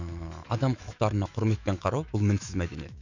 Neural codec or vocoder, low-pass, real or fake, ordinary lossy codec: autoencoder, 48 kHz, 128 numbers a frame, DAC-VAE, trained on Japanese speech; 7.2 kHz; fake; none